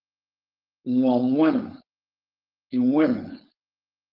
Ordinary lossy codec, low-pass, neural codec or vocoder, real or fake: Opus, 32 kbps; 5.4 kHz; codec, 16 kHz, 4.8 kbps, FACodec; fake